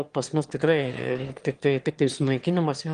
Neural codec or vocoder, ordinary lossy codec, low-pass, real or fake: autoencoder, 22.05 kHz, a latent of 192 numbers a frame, VITS, trained on one speaker; Opus, 24 kbps; 9.9 kHz; fake